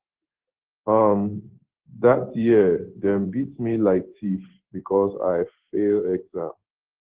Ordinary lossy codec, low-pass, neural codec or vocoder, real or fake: Opus, 16 kbps; 3.6 kHz; codec, 16 kHz in and 24 kHz out, 1 kbps, XY-Tokenizer; fake